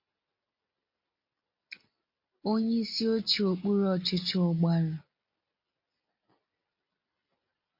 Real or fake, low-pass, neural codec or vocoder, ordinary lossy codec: real; 5.4 kHz; none; MP3, 32 kbps